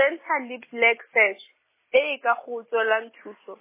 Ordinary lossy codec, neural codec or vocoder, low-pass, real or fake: MP3, 16 kbps; none; 3.6 kHz; real